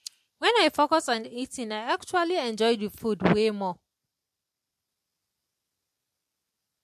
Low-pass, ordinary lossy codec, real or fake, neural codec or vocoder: 14.4 kHz; MP3, 64 kbps; real; none